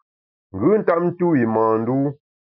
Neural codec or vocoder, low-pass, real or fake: none; 5.4 kHz; real